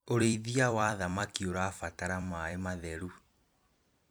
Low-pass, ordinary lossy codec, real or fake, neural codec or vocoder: none; none; fake; vocoder, 44.1 kHz, 128 mel bands every 256 samples, BigVGAN v2